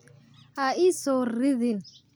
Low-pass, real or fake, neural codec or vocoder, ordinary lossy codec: none; real; none; none